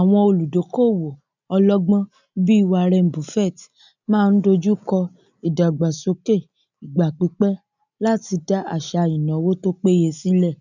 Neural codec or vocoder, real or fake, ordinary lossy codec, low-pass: none; real; none; 7.2 kHz